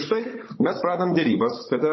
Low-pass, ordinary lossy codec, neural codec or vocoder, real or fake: 7.2 kHz; MP3, 24 kbps; codec, 16 kHz, 16 kbps, FunCodec, trained on Chinese and English, 50 frames a second; fake